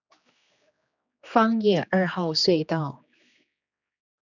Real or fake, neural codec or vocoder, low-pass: fake; codec, 16 kHz, 2 kbps, X-Codec, HuBERT features, trained on general audio; 7.2 kHz